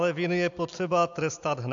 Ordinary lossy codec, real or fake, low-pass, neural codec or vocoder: AAC, 64 kbps; real; 7.2 kHz; none